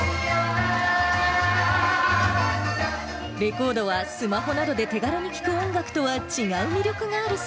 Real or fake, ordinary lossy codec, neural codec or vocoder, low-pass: real; none; none; none